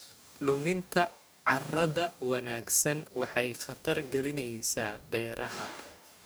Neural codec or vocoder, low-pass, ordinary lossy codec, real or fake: codec, 44.1 kHz, 2.6 kbps, DAC; none; none; fake